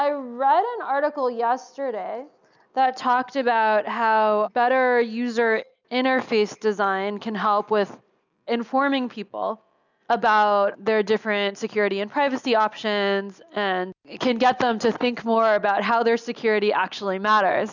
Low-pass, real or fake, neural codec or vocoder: 7.2 kHz; real; none